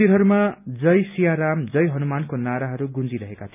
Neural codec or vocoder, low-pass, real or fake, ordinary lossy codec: none; 3.6 kHz; real; none